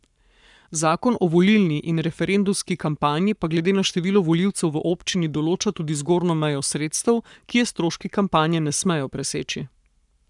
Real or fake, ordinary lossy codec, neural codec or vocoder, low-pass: fake; none; codec, 44.1 kHz, 7.8 kbps, Pupu-Codec; 10.8 kHz